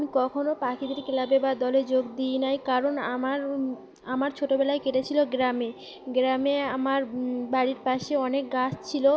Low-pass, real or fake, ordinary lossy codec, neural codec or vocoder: none; real; none; none